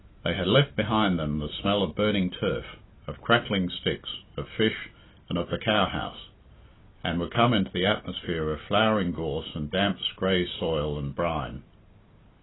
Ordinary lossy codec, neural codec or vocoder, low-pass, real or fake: AAC, 16 kbps; none; 7.2 kHz; real